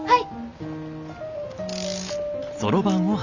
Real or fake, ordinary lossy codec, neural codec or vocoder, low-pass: real; none; none; 7.2 kHz